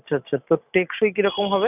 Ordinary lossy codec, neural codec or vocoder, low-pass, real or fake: none; none; 3.6 kHz; real